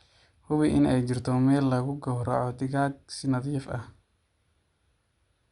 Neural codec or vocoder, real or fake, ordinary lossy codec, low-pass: none; real; Opus, 64 kbps; 10.8 kHz